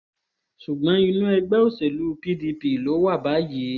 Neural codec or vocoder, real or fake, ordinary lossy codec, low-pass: none; real; none; 7.2 kHz